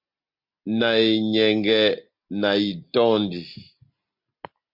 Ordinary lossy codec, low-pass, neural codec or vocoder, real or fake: MP3, 48 kbps; 5.4 kHz; none; real